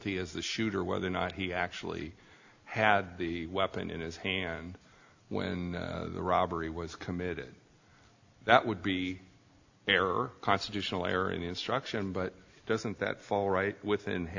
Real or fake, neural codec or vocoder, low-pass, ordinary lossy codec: real; none; 7.2 kHz; AAC, 48 kbps